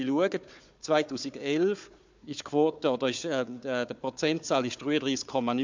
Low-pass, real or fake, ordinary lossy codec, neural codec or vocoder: 7.2 kHz; fake; MP3, 64 kbps; codec, 16 kHz, 4 kbps, FunCodec, trained on Chinese and English, 50 frames a second